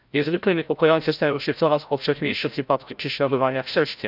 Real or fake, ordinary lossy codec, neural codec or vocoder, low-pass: fake; none; codec, 16 kHz, 0.5 kbps, FreqCodec, larger model; 5.4 kHz